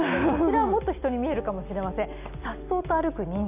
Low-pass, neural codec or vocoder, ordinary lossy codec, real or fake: 3.6 kHz; none; none; real